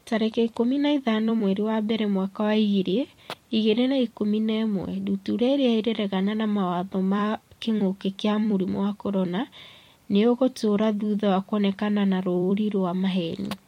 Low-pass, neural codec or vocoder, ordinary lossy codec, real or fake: 19.8 kHz; vocoder, 44.1 kHz, 128 mel bands every 256 samples, BigVGAN v2; MP3, 64 kbps; fake